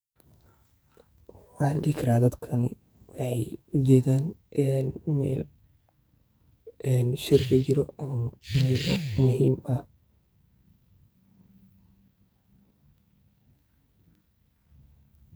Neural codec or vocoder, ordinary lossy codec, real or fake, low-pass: codec, 44.1 kHz, 2.6 kbps, SNAC; none; fake; none